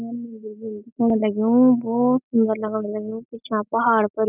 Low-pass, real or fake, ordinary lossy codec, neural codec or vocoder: 3.6 kHz; real; none; none